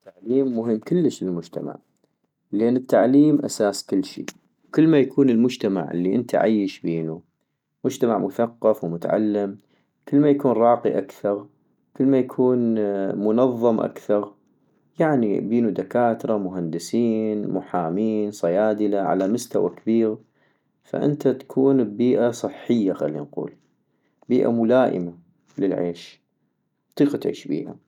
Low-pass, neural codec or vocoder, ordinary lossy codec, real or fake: 19.8 kHz; none; none; real